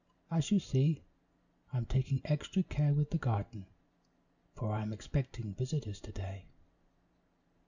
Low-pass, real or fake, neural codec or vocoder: 7.2 kHz; real; none